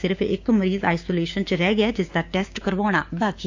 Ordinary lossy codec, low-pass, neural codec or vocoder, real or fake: AAC, 48 kbps; 7.2 kHz; codec, 16 kHz, 6 kbps, DAC; fake